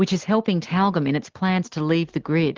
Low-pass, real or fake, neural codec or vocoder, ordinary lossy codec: 7.2 kHz; real; none; Opus, 16 kbps